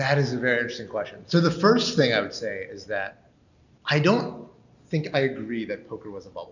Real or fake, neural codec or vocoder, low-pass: real; none; 7.2 kHz